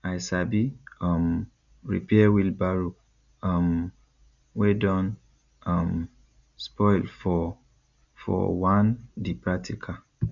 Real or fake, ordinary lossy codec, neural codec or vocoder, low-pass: real; none; none; 7.2 kHz